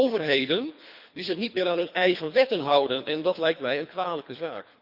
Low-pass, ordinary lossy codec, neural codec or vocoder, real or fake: 5.4 kHz; Opus, 64 kbps; codec, 24 kHz, 3 kbps, HILCodec; fake